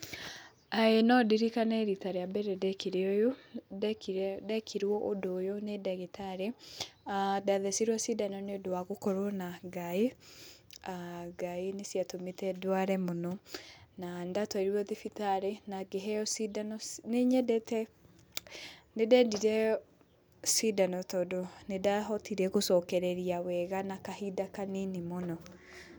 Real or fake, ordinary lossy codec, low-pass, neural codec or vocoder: real; none; none; none